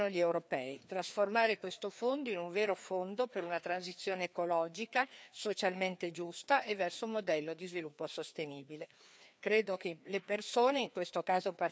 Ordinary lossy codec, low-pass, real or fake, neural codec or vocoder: none; none; fake; codec, 16 kHz, 2 kbps, FreqCodec, larger model